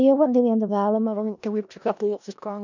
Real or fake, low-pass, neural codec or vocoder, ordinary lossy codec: fake; 7.2 kHz; codec, 16 kHz in and 24 kHz out, 0.4 kbps, LongCat-Audio-Codec, four codebook decoder; none